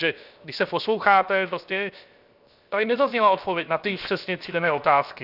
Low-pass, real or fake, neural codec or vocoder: 5.4 kHz; fake; codec, 16 kHz, 0.7 kbps, FocalCodec